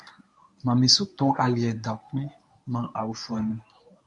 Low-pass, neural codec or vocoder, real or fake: 10.8 kHz; codec, 24 kHz, 0.9 kbps, WavTokenizer, medium speech release version 1; fake